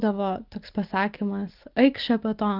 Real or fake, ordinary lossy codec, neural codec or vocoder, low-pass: real; Opus, 32 kbps; none; 5.4 kHz